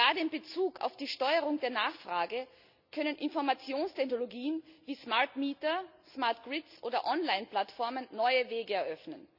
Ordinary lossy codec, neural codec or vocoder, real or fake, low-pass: none; none; real; 5.4 kHz